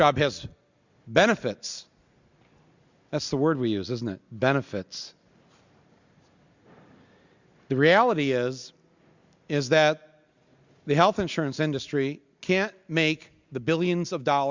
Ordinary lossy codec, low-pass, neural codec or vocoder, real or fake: Opus, 64 kbps; 7.2 kHz; none; real